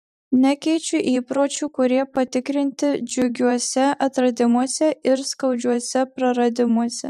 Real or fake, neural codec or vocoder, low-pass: fake; vocoder, 44.1 kHz, 128 mel bands every 512 samples, BigVGAN v2; 14.4 kHz